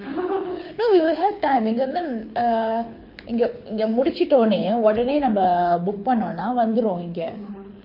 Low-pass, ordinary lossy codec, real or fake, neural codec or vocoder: 5.4 kHz; MP3, 32 kbps; fake; codec, 24 kHz, 6 kbps, HILCodec